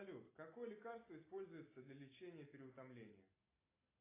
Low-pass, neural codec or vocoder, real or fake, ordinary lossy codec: 3.6 kHz; none; real; AAC, 16 kbps